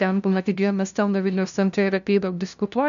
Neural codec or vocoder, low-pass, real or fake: codec, 16 kHz, 0.5 kbps, FunCodec, trained on LibriTTS, 25 frames a second; 7.2 kHz; fake